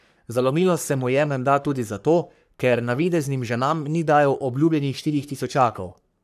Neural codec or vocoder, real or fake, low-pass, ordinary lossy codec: codec, 44.1 kHz, 3.4 kbps, Pupu-Codec; fake; 14.4 kHz; none